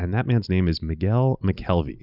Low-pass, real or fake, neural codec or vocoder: 5.4 kHz; real; none